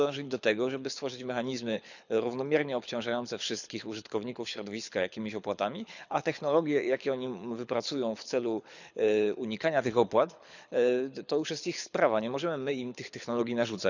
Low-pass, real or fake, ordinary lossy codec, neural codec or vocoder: 7.2 kHz; fake; none; codec, 24 kHz, 6 kbps, HILCodec